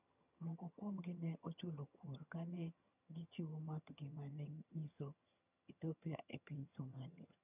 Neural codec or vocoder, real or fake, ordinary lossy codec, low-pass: vocoder, 22.05 kHz, 80 mel bands, HiFi-GAN; fake; none; 3.6 kHz